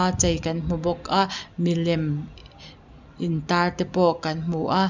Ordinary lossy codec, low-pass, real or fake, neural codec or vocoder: none; 7.2 kHz; real; none